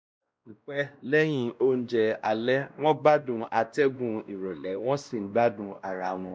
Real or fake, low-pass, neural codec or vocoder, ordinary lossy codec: fake; none; codec, 16 kHz, 1 kbps, X-Codec, WavLM features, trained on Multilingual LibriSpeech; none